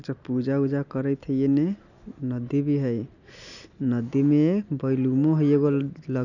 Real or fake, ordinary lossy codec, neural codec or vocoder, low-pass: real; none; none; 7.2 kHz